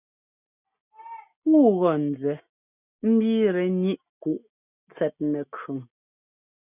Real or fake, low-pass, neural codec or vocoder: real; 3.6 kHz; none